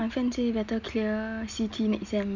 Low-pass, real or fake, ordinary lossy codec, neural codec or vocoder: 7.2 kHz; real; none; none